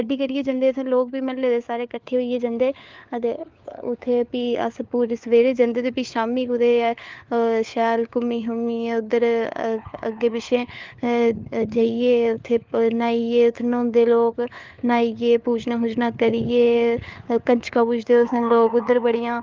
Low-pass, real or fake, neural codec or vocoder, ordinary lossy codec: 7.2 kHz; fake; codec, 16 kHz, 4 kbps, FunCodec, trained on LibriTTS, 50 frames a second; Opus, 32 kbps